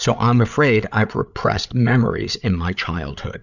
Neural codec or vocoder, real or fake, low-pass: codec, 16 kHz, 8 kbps, FreqCodec, larger model; fake; 7.2 kHz